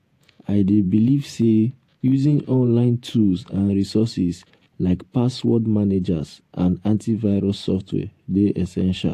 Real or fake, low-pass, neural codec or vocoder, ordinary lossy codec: fake; 14.4 kHz; vocoder, 48 kHz, 128 mel bands, Vocos; AAC, 64 kbps